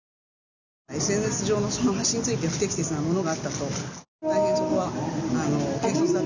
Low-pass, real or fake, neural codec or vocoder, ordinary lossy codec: 7.2 kHz; real; none; none